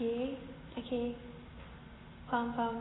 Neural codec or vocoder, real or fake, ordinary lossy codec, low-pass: none; real; AAC, 16 kbps; 7.2 kHz